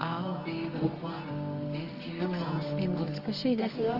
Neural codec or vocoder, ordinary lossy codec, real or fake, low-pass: codec, 24 kHz, 0.9 kbps, WavTokenizer, medium music audio release; Opus, 24 kbps; fake; 5.4 kHz